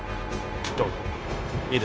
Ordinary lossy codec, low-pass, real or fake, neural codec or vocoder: none; none; fake; codec, 16 kHz, 0.9 kbps, LongCat-Audio-Codec